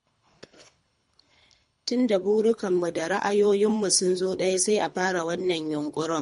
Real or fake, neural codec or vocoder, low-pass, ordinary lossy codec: fake; codec, 24 kHz, 3 kbps, HILCodec; 10.8 kHz; MP3, 48 kbps